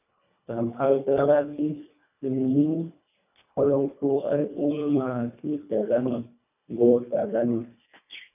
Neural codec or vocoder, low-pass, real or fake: codec, 24 kHz, 1.5 kbps, HILCodec; 3.6 kHz; fake